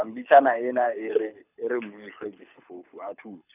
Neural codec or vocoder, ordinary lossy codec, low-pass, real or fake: codec, 24 kHz, 6 kbps, HILCodec; none; 3.6 kHz; fake